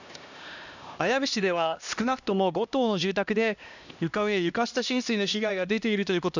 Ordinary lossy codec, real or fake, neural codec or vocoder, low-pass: none; fake; codec, 16 kHz, 1 kbps, X-Codec, HuBERT features, trained on LibriSpeech; 7.2 kHz